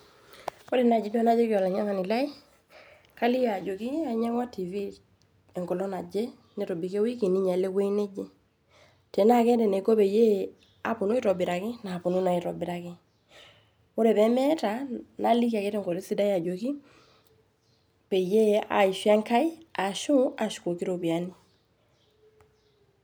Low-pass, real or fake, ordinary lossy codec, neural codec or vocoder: none; real; none; none